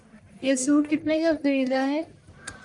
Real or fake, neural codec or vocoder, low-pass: fake; codec, 44.1 kHz, 1.7 kbps, Pupu-Codec; 10.8 kHz